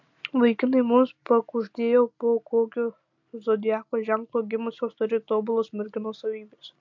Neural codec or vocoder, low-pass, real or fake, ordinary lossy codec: none; 7.2 kHz; real; MP3, 48 kbps